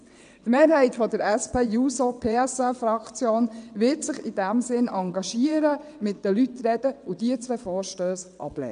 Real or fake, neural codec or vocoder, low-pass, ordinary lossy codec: fake; vocoder, 22.05 kHz, 80 mel bands, WaveNeXt; 9.9 kHz; none